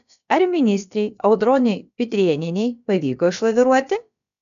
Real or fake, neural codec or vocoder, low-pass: fake; codec, 16 kHz, about 1 kbps, DyCAST, with the encoder's durations; 7.2 kHz